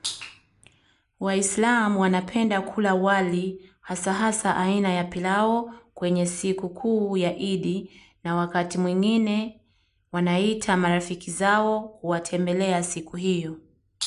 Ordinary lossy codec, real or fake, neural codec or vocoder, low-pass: none; real; none; 10.8 kHz